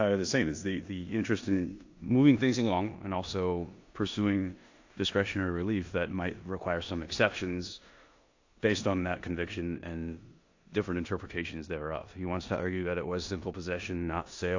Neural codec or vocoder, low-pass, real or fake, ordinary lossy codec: codec, 16 kHz in and 24 kHz out, 0.9 kbps, LongCat-Audio-Codec, four codebook decoder; 7.2 kHz; fake; AAC, 48 kbps